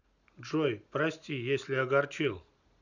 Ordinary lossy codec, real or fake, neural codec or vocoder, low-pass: none; real; none; 7.2 kHz